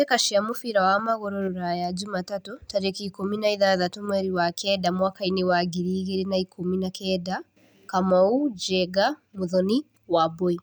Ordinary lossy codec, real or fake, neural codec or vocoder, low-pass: none; real; none; none